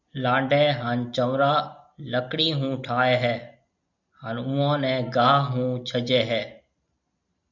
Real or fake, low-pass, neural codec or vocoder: real; 7.2 kHz; none